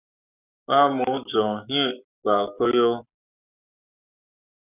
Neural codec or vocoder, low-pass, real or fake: codec, 44.1 kHz, 7.8 kbps, Pupu-Codec; 3.6 kHz; fake